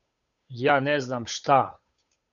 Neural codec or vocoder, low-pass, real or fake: codec, 16 kHz, 8 kbps, FunCodec, trained on Chinese and English, 25 frames a second; 7.2 kHz; fake